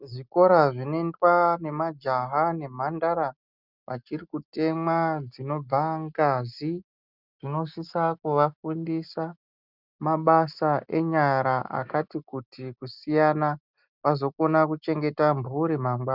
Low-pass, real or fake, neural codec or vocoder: 5.4 kHz; real; none